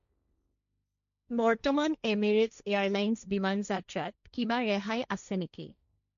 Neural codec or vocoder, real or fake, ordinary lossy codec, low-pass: codec, 16 kHz, 1.1 kbps, Voila-Tokenizer; fake; none; 7.2 kHz